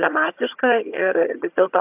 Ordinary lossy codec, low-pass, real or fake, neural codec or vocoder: AAC, 32 kbps; 3.6 kHz; fake; vocoder, 22.05 kHz, 80 mel bands, HiFi-GAN